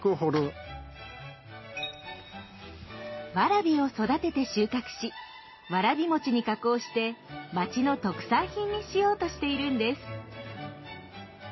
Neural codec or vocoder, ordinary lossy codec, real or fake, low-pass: none; MP3, 24 kbps; real; 7.2 kHz